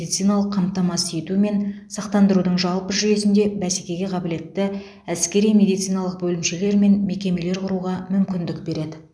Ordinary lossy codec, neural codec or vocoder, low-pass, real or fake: none; none; none; real